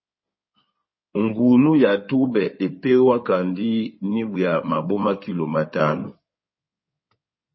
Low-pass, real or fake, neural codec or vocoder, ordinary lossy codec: 7.2 kHz; fake; codec, 16 kHz in and 24 kHz out, 2.2 kbps, FireRedTTS-2 codec; MP3, 24 kbps